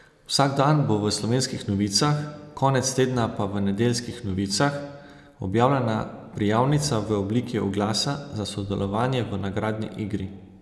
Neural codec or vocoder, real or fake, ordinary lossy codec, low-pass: none; real; none; none